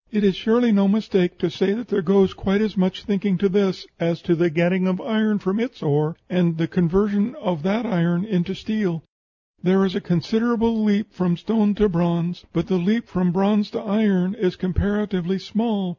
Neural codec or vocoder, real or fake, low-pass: none; real; 7.2 kHz